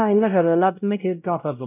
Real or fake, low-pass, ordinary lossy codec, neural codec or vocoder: fake; 3.6 kHz; none; codec, 16 kHz, 0.5 kbps, X-Codec, WavLM features, trained on Multilingual LibriSpeech